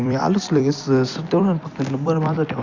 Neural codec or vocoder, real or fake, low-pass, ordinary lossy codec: vocoder, 44.1 kHz, 128 mel bands every 256 samples, BigVGAN v2; fake; 7.2 kHz; Opus, 64 kbps